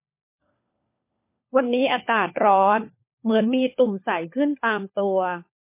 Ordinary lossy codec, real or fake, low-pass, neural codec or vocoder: MP3, 24 kbps; fake; 3.6 kHz; codec, 16 kHz, 4 kbps, FunCodec, trained on LibriTTS, 50 frames a second